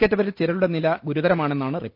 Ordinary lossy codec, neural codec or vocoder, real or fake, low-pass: Opus, 24 kbps; none; real; 5.4 kHz